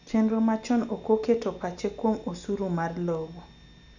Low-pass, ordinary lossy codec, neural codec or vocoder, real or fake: 7.2 kHz; none; none; real